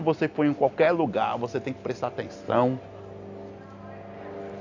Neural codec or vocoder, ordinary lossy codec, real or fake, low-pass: none; AAC, 48 kbps; real; 7.2 kHz